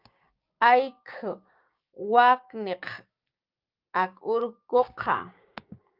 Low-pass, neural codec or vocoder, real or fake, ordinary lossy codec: 5.4 kHz; codec, 16 kHz, 6 kbps, DAC; fake; Opus, 24 kbps